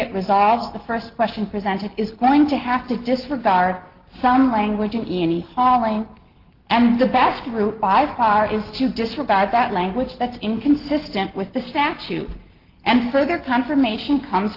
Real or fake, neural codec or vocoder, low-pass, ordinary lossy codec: real; none; 5.4 kHz; Opus, 16 kbps